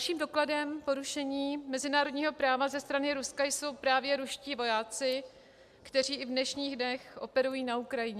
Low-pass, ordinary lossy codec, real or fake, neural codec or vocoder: 14.4 kHz; MP3, 96 kbps; real; none